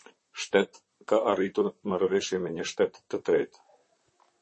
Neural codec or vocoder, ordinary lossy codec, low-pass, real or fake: vocoder, 44.1 kHz, 128 mel bands, Pupu-Vocoder; MP3, 32 kbps; 10.8 kHz; fake